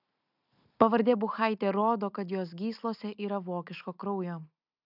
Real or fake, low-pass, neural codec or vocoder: real; 5.4 kHz; none